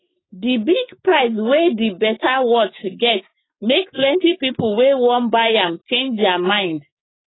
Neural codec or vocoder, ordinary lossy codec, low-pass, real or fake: codec, 16 kHz, 6 kbps, DAC; AAC, 16 kbps; 7.2 kHz; fake